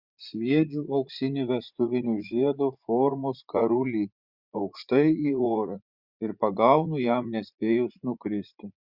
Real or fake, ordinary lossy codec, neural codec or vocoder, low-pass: fake; Opus, 64 kbps; vocoder, 44.1 kHz, 80 mel bands, Vocos; 5.4 kHz